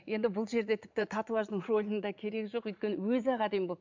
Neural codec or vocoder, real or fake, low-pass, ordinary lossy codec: vocoder, 44.1 kHz, 80 mel bands, Vocos; fake; 7.2 kHz; MP3, 64 kbps